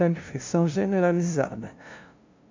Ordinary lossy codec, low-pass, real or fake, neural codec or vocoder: MP3, 48 kbps; 7.2 kHz; fake; codec, 16 kHz, 0.5 kbps, FunCodec, trained on LibriTTS, 25 frames a second